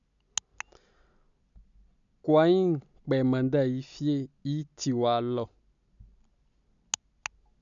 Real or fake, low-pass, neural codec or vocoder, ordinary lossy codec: real; 7.2 kHz; none; none